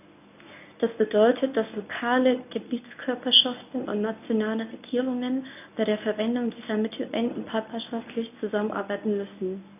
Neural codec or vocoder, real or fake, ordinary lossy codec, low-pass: codec, 24 kHz, 0.9 kbps, WavTokenizer, medium speech release version 1; fake; none; 3.6 kHz